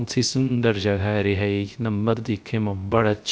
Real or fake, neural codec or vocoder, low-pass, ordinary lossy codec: fake; codec, 16 kHz, 0.3 kbps, FocalCodec; none; none